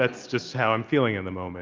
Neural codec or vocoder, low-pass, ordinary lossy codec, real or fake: none; 7.2 kHz; Opus, 24 kbps; real